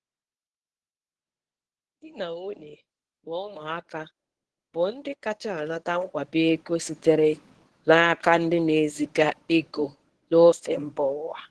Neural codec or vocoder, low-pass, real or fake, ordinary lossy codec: codec, 24 kHz, 0.9 kbps, WavTokenizer, medium speech release version 1; 10.8 kHz; fake; Opus, 16 kbps